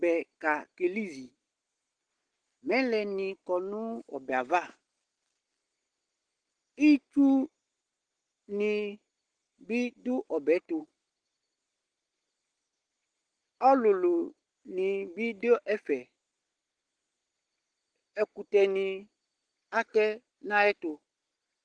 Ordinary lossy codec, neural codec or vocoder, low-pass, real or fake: Opus, 16 kbps; none; 9.9 kHz; real